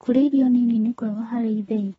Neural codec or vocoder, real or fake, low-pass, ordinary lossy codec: codec, 24 kHz, 3 kbps, HILCodec; fake; 10.8 kHz; AAC, 24 kbps